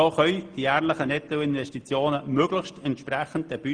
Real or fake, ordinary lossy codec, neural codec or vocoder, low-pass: real; Opus, 24 kbps; none; 9.9 kHz